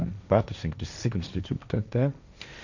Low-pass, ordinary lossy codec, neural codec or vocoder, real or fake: 7.2 kHz; none; codec, 16 kHz, 1.1 kbps, Voila-Tokenizer; fake